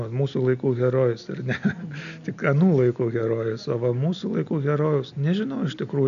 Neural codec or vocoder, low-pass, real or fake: none; 7.2 kHz; real